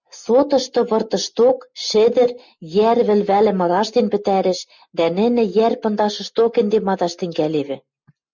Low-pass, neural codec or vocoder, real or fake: 7.2 kHz; none; real